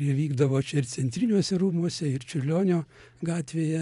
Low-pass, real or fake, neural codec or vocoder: 10.8 kHz; fake; vocoder, 24 kHz, 100 mel bands, Vocos